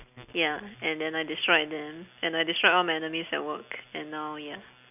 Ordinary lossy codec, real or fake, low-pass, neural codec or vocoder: none; real; 3.6 kHz; none